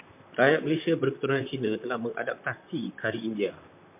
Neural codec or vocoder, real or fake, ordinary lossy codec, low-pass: vocoder, 44.1 kHz, 128 mel bands, Pupu-Vocoder; fake; MP3, 32 kbps; 3.6 kHz